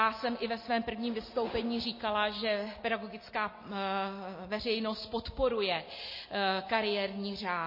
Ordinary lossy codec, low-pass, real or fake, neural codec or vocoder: MP3, 24 kbps; 5.4 kHz; real; none